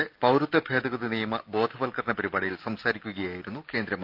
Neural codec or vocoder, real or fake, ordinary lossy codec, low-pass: none; real; Opus, 32 kbps; 5.4 kHz